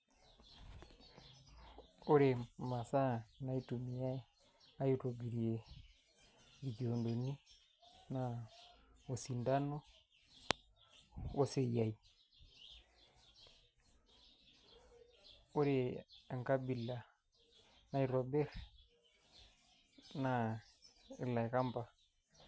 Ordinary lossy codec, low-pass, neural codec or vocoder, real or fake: none; none; none; real